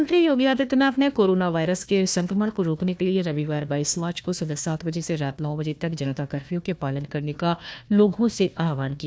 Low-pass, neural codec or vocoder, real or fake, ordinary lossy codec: none; codec, 16 kHz, 1 kbps, FunCodec, trained on Chinese and English, 50 frames a second; fake; none